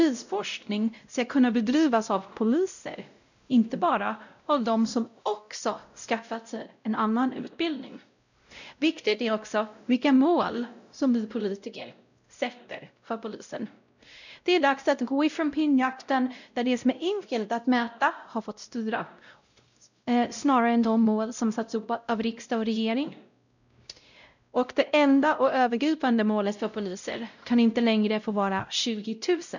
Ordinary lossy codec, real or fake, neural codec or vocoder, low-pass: none; fake; codec, 16 kHz, 0.5 kbps, X-Codec, WavLM features, trained on Multilingual LibriSpeech; 7.2 kHz